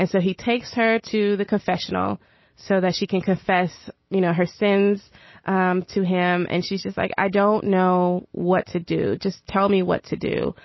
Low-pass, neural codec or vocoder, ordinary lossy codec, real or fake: 7.2 kHz; none; MP3, 24 kbps; real